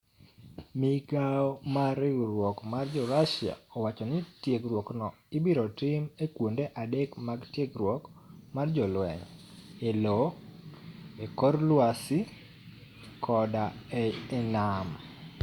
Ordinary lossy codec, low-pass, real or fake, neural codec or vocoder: Opus, 64 kbps; 19.8 kHz; real; none